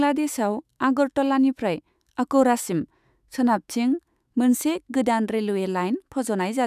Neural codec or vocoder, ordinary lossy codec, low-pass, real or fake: autoencoder, 48 kHz, 128 numbers a frame, DAC-VAE, trained on Japanese speech; none; 14.4 kHz; fake